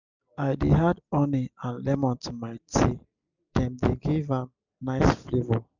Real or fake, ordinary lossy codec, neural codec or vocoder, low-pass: real; none; none; 7.2 kHz